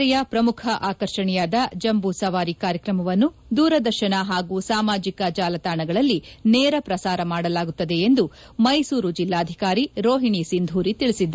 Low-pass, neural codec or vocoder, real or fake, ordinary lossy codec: none; none; real; none